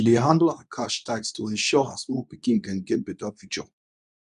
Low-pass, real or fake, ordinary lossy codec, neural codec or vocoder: 10.8 kHz; fake; none; codec, 24 kHz, 0.9 kbps, WavTokenizer, medium speech release version 2